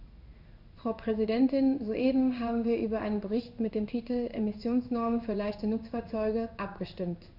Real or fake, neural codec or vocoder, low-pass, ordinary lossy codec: fake; codec, 16 kHz in and 24 kHz out, 1 kbps, XY-Tokenizer; 5.4 kHz; none